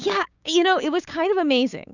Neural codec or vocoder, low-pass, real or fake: codec, 16 kHz, 6 kbps, DAC; 7.2 kHz; fake